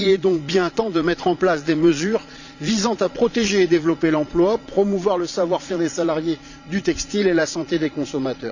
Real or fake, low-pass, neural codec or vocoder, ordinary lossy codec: fake; 7.2 kHz; vocoder, 22.05 kHz, 80 mel bands, Vocos; none